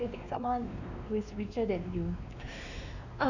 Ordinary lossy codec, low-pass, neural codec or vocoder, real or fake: none; 7.2 kHz; codec, 16 kHz, 2 kbps, X-Codec, WavLM features, trained on Multilingual LibriSpeech; fake